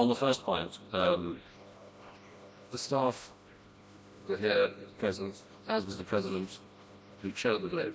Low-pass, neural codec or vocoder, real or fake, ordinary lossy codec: none; codec, 16 kHz, 1 kbps, FreqCodec, smaller model; fake; none